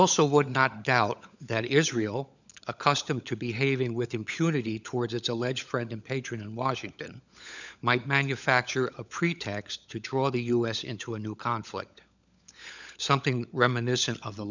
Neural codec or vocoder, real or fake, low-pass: codec, 16 kHz, 16 kbps, FunCodec, trained on LibriTTS, 50 frames a second; fake; 7.2 kHz